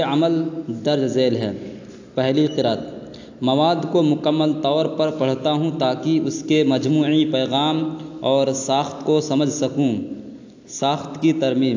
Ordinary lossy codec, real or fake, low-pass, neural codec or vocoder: AAC, 48 kbps; real; 7.2 kHz; none